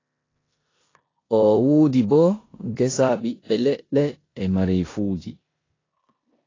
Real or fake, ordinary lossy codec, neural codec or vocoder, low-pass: fake; AAC, 32 kbps; codec, 16 kHz in and 24 kHz out, 0.9 kbps, LongCat-Audio-Codec, four codebook decoder; 7.2 kHz